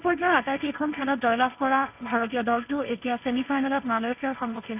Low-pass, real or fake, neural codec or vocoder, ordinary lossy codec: 3.6 kHz; fake; codec, 16 kHz, 1.1 kbps, Voila-Tokenizer; none